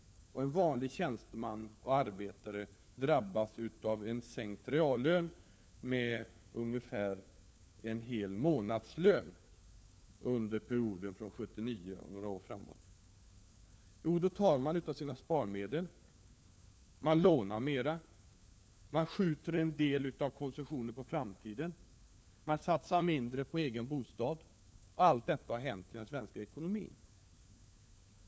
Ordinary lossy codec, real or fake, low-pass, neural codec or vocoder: none; fake; none; codec, 16 kHz, 4 kbps, FunCodec, trained on LibriTTS, 50 frames a second